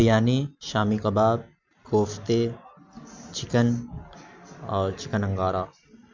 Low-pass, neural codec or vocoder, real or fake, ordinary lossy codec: 7.2 kHz; none; real; MP3, 64 kbps